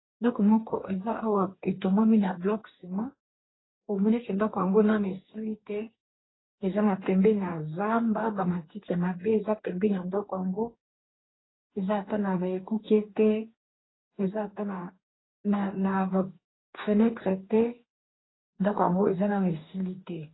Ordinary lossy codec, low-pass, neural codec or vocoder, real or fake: AAC, 16 kbps; 7.2 kHz; codec, 44.1 kHz, 2.6 kbps, DAC; fake